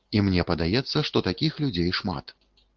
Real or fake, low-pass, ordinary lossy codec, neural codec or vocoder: real; 7.2 kHz; Opus, 16 kbps; none